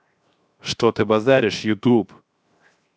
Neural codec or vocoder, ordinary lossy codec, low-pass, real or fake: codec, 16 kHz, 0.7 kbps, FocalCodec; none; none; fake